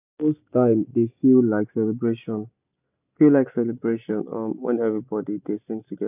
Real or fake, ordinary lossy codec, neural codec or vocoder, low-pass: real; AAC, 32 kbps; none; 3.6 kHz